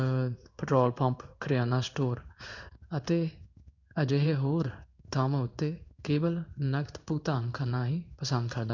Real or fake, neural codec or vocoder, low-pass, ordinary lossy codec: fake; codec, 16 kHz in and 24 kHz out, 1 kbps, XY-Tokenizer; 7.2 kHz; none